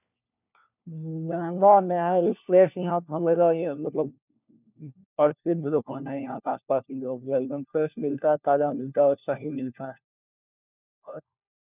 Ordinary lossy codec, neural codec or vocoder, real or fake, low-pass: none; codec, 16 kHz, 1 kbps, FunCodec, trained on LibriTTS, 50 frames a second; fake; 3.6 kHz